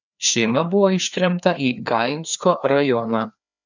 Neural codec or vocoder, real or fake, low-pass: codec, 16 kHz, 2 kbps, FreqCodec, larger model; fake; 7.2 kHz